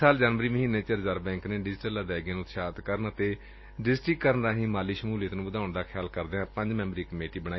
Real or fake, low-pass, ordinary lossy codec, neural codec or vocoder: real; 7.2 kHz; MP3, 24 kbps; none